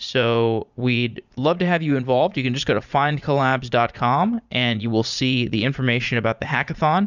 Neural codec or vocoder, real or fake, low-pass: vocoder, 44.1 kHz, 80 mel bands, Vocos; fake; 7.2 kHz